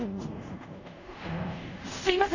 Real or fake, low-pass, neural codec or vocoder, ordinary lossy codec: fake; 7.2 kHz; codec, 24 kHz, 0.5 kbps, DualCodec; none